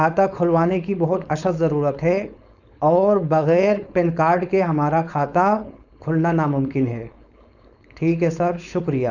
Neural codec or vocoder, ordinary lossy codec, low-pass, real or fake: codec, 16 kHz, 4.8 kbps, FACodec; none; 7.2 kHz; fake